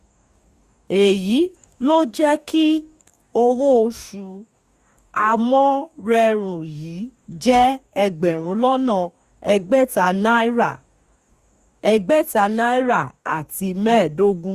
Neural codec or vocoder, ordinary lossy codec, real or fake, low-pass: codec, 44.1 kHz, 2.6 kbps, DAC; Opus, 64 kbps; fake; 14.4 kHz